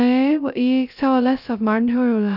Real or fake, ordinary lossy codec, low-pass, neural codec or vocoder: fake; none; 5.4 kHz; codec, 16 kHz, 0.2 kbps, FocalCodec